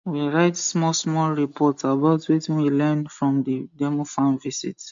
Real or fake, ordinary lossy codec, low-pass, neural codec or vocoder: real; MP3, 48 kbps; 7.2 kHz; none